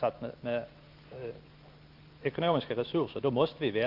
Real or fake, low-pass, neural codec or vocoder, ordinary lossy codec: real; 5.4 kHz; none; Opus, 24 kbps